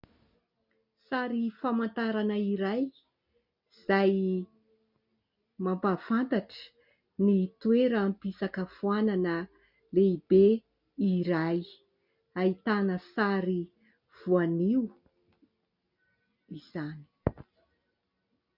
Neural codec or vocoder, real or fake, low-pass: none; real; 5.4 kHz